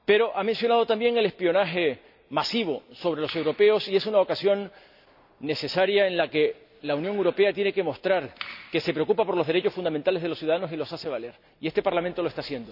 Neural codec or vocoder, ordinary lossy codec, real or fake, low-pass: none; none; real; 5.4 kHz